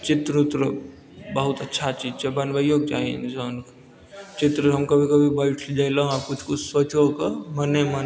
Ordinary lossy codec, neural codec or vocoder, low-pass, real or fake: none; none; none; real